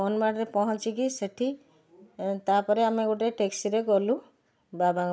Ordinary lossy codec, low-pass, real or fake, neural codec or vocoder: none; none; real; none